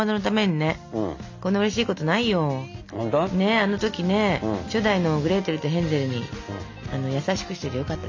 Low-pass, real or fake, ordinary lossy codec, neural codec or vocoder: 7.2 kHz; real; MP3, 64 kbps; none